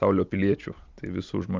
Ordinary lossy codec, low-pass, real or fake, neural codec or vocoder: Opus, 24 kbps; 7.2 kHz; real; none